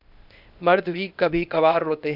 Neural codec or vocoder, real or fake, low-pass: codec, 16 kHz, 0.8 kbps, ZipCodec; fake; 5.4 kHz